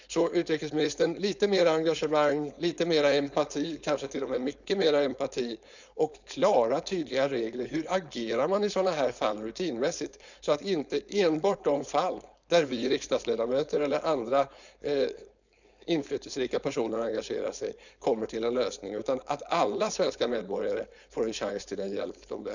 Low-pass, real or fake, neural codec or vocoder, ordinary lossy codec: 7.2 kHz; fake; codec, 16 kHz, 4.8 kbps, FACodec; none